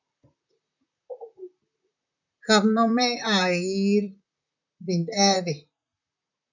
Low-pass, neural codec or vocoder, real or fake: 7.2 kHz; vocoder, 44.1 kHz, 128 mel bands, Pupu-Vocoder; fake